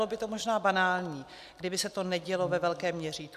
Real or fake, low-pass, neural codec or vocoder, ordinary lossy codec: real; 14.4 kHz; none; MP3, 96 kbps